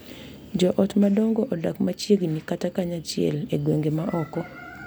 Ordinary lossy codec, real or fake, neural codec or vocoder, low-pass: none; real; none; none